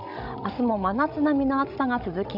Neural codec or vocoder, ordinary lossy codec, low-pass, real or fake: codec, 16 kHz, 16 kbps, FreqCodec, larger model; none; 5.4 kHz; fake